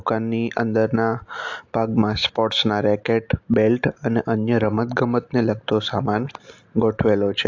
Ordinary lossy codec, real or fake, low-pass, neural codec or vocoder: AAC, 48 kbps; real; 7.2 kHz; none